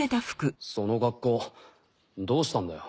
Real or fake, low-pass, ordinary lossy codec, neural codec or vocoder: real; none; none; none